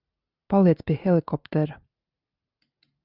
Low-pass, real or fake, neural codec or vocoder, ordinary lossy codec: 5.4 kHz; real; none; Opus, 64 kbps